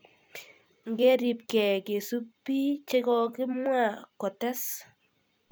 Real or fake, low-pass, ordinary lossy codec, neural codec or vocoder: fake; none; none; vocoder, 44.1 kHz, 128 mel bands every 256 samples, BigVGAN v2